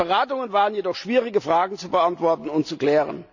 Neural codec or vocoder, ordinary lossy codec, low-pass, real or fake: none; none; 7.2 kHz; real